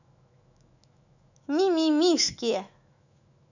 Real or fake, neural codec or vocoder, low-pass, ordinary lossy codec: fake; autoencoder, 48 kHz, 128 numbers a frame, DAC-VAE, trained on Japanese speech; 7.2 kHz; none